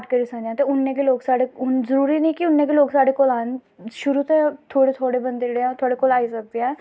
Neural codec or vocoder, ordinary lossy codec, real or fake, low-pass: none; none; real; none